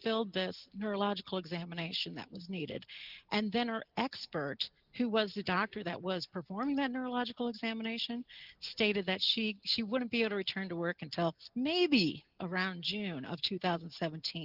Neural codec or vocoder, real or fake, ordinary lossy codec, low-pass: none; real; Opus, 32 kbps; 5.4 kHz